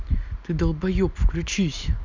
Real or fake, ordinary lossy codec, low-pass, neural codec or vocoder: real; none; 7.2 kHz; none